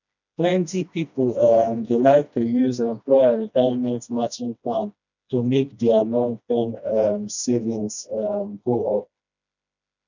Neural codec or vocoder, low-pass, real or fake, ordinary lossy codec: codec, 16 kHz, 1 kbps, FreqCodec, smaller model; 7.2 kHz; fake; none